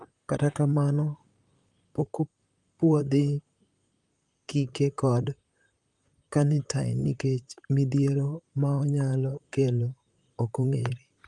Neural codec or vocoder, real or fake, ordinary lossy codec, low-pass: vocoder, 44.1 kHz, 128 mel bands, Pupu-Vocoder; fake; Opus, 32 kbps; 10.8 kHz